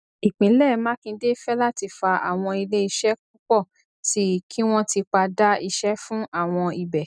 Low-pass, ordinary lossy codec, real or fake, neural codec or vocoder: 9.9 kHz; none; real; none